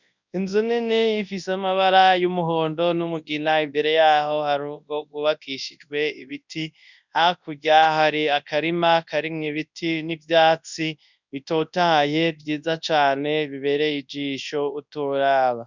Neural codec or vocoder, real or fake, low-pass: codec, 24 kHz, 0.9 kbps, WavTokenizer, large speech release; fake; 7.2 kHz